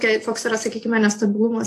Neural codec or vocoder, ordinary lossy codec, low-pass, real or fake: none; AAC, 48 kbps; 14.4 kHz; real